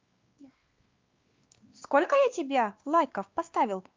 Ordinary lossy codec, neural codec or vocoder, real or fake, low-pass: Opus, 24 kbps; codec, 16 kHz, 2 kbps, X-Codec, WavLM features, trained on Multilingual LibriSpeech; fake; 7.2 kHz